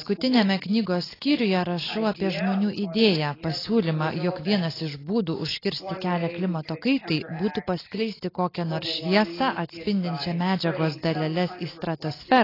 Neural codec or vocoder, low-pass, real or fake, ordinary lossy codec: none; 5.4 kHz; real; AAC, 24 kbps